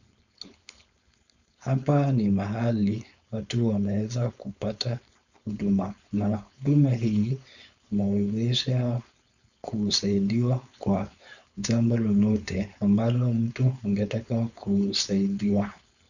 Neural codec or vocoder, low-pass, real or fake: codec, 16 kHz, 4.8 kbps, FACodec; 7.2 kHz; fake